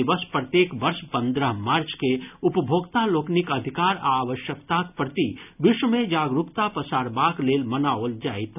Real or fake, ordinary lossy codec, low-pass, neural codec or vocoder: real; none; 3.6 kHz; none